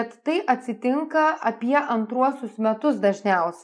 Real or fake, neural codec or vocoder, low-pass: real; none; 9.9 kHz